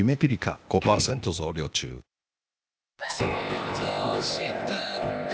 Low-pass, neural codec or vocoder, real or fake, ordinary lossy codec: none; codec, 16 kHz, 0.8 kbps, ZipCodec; fake; none